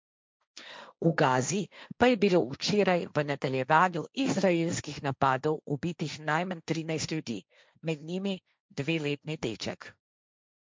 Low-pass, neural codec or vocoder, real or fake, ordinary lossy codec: none; codec, 16 kHz, 1.1 kbps, Voila-Tokenizer; fake; none